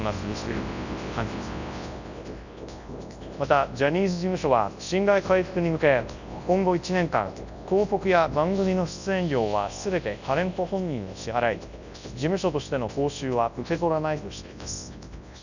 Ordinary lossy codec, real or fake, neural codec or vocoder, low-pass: none; fake; codec, 24 kHz, 0.9 kbps, WavTokenizer, large speech release; 7.2 kHz